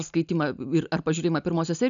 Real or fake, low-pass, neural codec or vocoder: real; 7.2 kHz; none